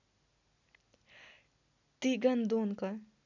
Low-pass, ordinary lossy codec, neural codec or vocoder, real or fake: 7.2 kHz; none; none; real